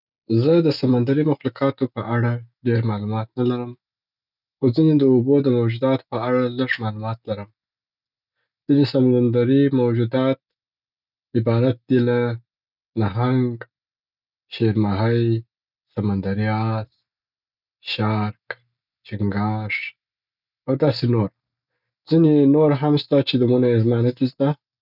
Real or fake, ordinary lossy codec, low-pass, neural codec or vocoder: real; none; 5.4 kHz; none